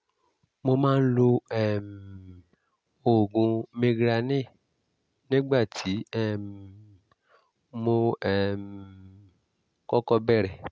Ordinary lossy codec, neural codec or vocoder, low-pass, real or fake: none; none; none; real